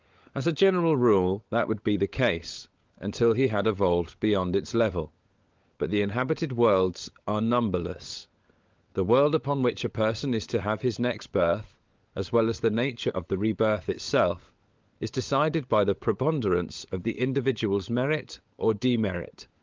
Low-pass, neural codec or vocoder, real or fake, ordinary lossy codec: 7.2 kHz; codec, 16 kHz, 16 kbps, FunCodec, trained on LibriTTS, 50 frames a second; fake; Opus, 24 kbps